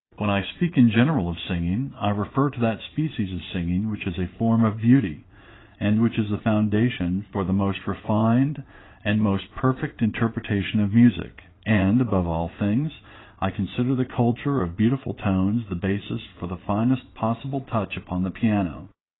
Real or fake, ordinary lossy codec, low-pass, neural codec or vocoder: fake; AAC, 16 kbps; 7.2 kHz; vocoder, 22.05 kHz, 80 mel bands, Vocos